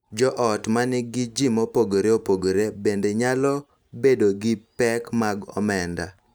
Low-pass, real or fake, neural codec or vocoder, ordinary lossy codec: none; real; none; none